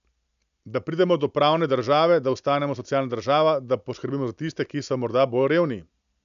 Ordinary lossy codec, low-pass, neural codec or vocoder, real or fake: MP3, 96 kbps; 7.2 kHz; none; real